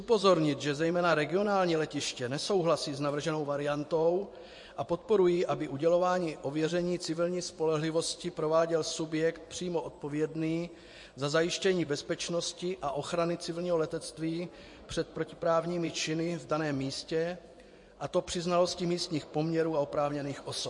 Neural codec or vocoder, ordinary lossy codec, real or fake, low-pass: vocoder, 24 kHz, 100 mel bands, Vocos; MP3, 48 kbps; fake; 10.8 kHz